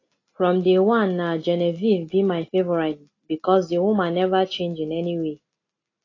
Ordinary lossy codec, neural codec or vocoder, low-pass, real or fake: AAC, 32 kbps; none; 7.2 kHz; real